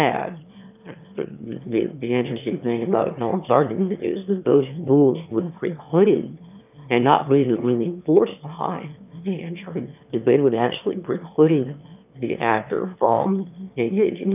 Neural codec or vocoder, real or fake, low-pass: autoencoder, 22.05 kHz, a latent of 192 numbers a frame, VITS, trained on one speaker; fake; 3.6 kHz